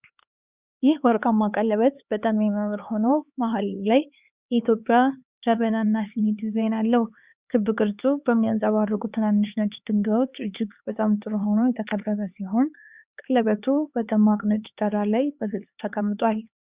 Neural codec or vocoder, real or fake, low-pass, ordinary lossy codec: codec, 16 kHz, 4 kbps, X-Codec, HuBERT features, trained on LibriSpeech; fake; 3.6 kHz; Opus, 64 kbps